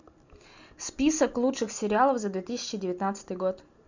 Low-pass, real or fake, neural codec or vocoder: 7.2 kHz; real; none